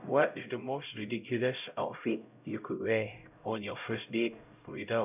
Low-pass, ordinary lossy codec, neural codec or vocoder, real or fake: 3.6 kHz; none; codec, 16 kHz, 0.5 kbps, X-Codec, HuBERT features, trained on LibriSpeech; fake